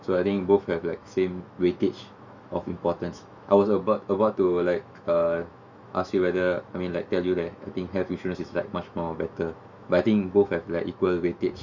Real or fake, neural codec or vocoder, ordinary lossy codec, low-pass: fake; autoencoder, 48 kHz, 128 numbers a frame, DAC-VAE, trained on Japanese speech; Opus, 64 kbps; 7.2 kHz